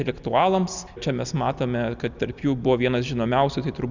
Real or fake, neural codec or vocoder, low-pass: real; none; 7.2 kHz